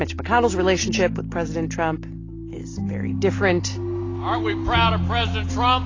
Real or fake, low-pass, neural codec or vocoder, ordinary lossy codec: real; 7.2 kHz; none; AAC, 32 kbps